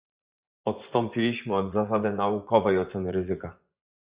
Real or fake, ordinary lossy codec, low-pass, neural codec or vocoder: fake; Opus, 64 kbps; 3.6 kHz; vocoder, 24 kHz, 100 mel bands, Vocos